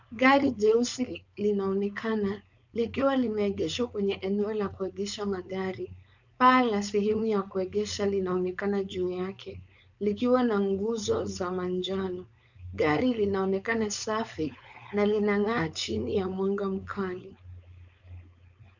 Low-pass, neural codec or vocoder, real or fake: 7.2 kHz; codec, 16 kHz, 4.8 kbps, FACodec; fake